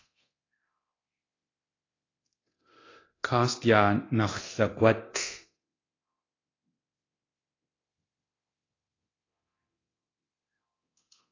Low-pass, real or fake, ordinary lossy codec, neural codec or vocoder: 7.2 kHz; fake; AAC, 32 kbps; codec, 24 kHz, 0.9 kbps, DualCodec